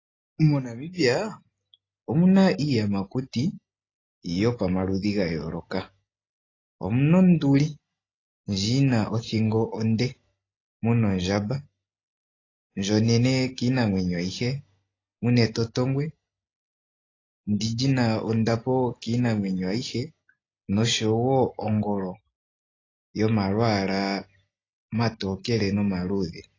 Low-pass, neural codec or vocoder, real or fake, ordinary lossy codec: 7.2 kHz; none; real; AAC, 32 kbps